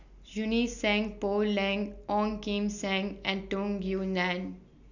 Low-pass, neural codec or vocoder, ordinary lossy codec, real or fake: 7.2 kHz; none; none; real